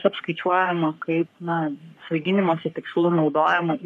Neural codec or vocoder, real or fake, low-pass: codec, 44.1 kHz, 3.4 kbps, Pupu-Codec; fake; 14.4 kHz